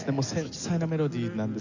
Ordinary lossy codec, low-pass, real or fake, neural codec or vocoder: none; 7.2 kHz; real; none